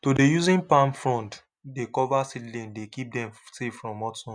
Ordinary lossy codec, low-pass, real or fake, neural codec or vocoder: none; 9.9 kHz; real; none